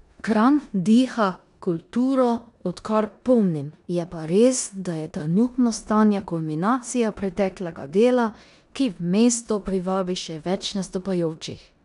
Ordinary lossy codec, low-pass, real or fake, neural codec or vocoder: none; 10.8 kHz; fake; codec, 16 kHz in and 24 kHz out, 0.9 kbps, LongCat-Audio-Codec, four codebook decoder